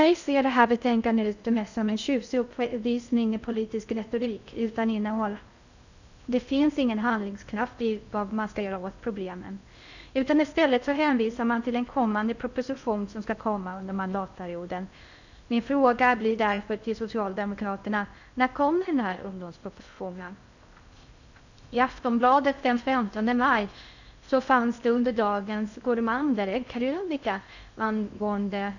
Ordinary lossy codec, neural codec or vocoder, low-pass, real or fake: none; codec, 16 kHz in and 24 kHz out, 0.6 kbps, FocalCodec, streaming, 2048 codes; 7.2 kHz; fake